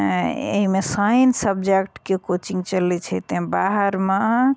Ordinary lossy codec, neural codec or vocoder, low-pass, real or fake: none; none; none; real